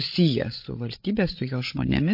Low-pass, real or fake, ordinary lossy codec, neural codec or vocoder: 5.4 kHz; fake; MP3, 32 kbps; codec, 16 kHz, 8 kbps, FreqCodec, larger model